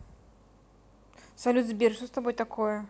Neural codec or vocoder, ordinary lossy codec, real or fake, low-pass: none; none; real; none